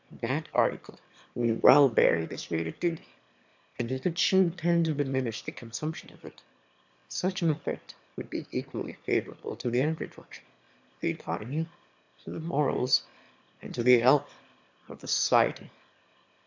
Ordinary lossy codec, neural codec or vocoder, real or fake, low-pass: MP3, 64 kbps; autoencoder, 22.05 kHz, a latent of 192 numbers a frame, VITS, trained on one speaker; fake; 7.2 kHz